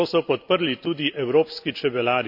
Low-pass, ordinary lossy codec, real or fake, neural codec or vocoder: 5.4 kHz; none; real; none